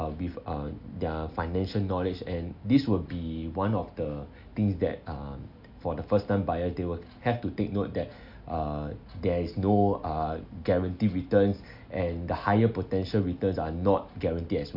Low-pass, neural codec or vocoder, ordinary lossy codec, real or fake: 5.4 kHz; none; none; real